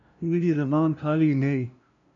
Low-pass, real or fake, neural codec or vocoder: 7.2 kHz; fake; codec, 16 kHz, 0.5 kbps, FunCodec, trained on LibriTTS, 25 frames a second